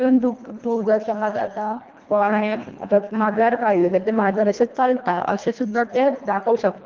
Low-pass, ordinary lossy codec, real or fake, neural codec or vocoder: 7.2 kHz; Opus, 32 kbps; fake; codec, 24 kHz, 1.5 kbps, HILCodec